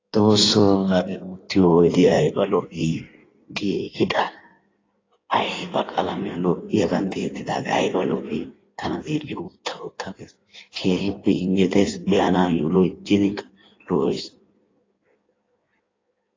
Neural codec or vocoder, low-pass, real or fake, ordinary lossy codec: codec, 16 kHz in and 24 kHz out, 1.1 kbps, FireRedTTS-2 codec; 7.2 kHz; fake; AAC, 32 kbps